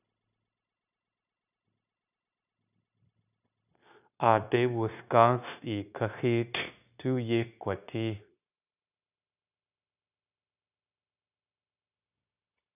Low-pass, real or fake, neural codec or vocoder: 3.6 kHz; fake; codec, 16 kHz, 0.9 kbps, LongCat-Audio-Codec